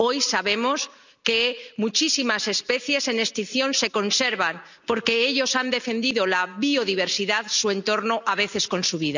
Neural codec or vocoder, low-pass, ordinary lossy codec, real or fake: none; 7.2 kHz; none; real